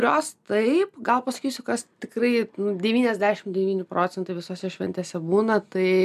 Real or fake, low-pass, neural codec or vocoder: real; 14.4 kHz; none